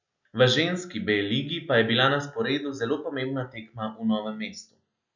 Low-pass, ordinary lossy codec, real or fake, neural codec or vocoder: 7.2 kHz; none; real; none